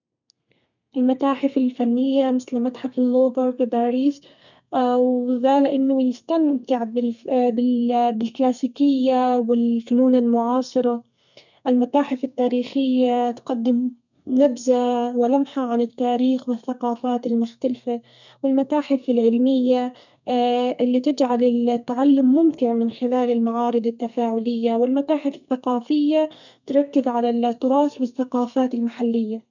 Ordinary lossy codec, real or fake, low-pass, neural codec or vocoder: none; fake; 7.2 kHz; codec, 32 kHz, 1.9 kbps, SNAC